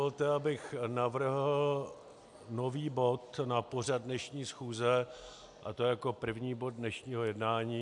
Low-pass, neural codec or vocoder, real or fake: 10.8 kHz; none; real